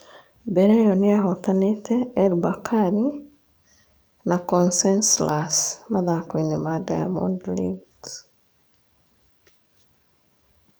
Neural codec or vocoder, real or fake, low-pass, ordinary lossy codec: codec, 44.1 kHz, 7.8 kbps, DAC; fake; none; none